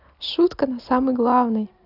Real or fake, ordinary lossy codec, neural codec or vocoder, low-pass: real; AAC, 48 kbps; none; 5.4 kHz